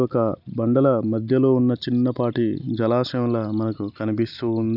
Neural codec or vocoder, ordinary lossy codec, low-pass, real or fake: none; none; 5.4 kHz; real